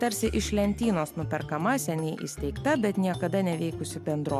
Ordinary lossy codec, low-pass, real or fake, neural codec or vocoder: MP3, 96 kbps; 14.4 kHz; real; none